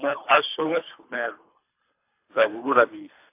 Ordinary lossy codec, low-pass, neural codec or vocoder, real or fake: AAC, 24 kbps; 3.6 kHz; codec, 24 kHz, 3 kbps, HILCodec; fake